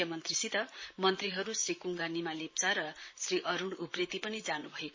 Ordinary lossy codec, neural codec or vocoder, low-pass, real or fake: MP3, 32 kbps; vocoder, 44.1 kHz, 128 mel bands, Pupu-Vocoder; 7.2 kHz; fake